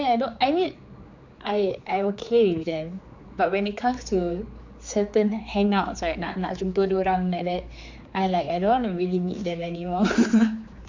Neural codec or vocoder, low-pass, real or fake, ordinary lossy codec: codec, 16 kHz, 4 kbps, X-Codec, HuBERT features, trained on general audio; 7.2 kHz; fake; MP3, 64 kbps